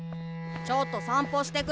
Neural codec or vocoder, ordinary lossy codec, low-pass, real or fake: none; none; none; real